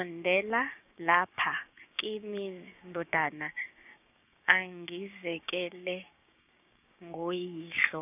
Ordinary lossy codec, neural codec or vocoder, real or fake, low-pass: none; codec, 16 kHz, 6 kbps, DAC; fake; 3.6 kHz